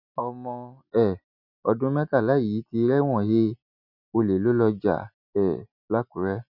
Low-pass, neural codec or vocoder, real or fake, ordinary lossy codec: 5.4 kHz; none; real; none